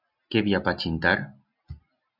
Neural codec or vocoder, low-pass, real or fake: none; 5.4 kHz; real